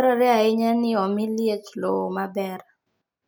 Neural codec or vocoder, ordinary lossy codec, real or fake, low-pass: none; none; real; none